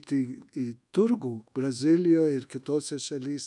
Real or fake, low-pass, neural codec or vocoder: fake; 10.8 kHz; codec, 24 kHz, 1.2 kbps, DualCodec